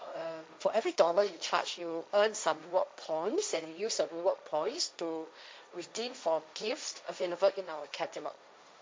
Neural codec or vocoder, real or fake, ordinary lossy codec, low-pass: codec, 16 kHz, 1.1 kbps, Voila-Tokenizer; fake; none; none